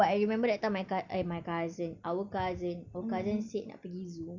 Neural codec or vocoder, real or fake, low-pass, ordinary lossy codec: none; real; 7.2 kHz; Opus, 64 kbps